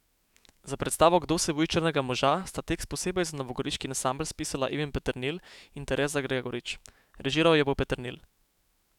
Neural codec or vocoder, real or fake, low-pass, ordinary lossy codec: autoencoder, 48 kHz, 128 numbers a frame, DAC-VAE, trained on Japanese speech; fake; 19.8 kHz; none